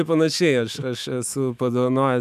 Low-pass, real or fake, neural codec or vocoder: 14.4 kHz; fake; autoencoder, 48 kHz, 128 numbers a frame, DAC-VAE, trained on Japanese speech